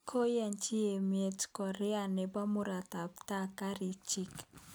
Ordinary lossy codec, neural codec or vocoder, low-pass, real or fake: none; none; none; real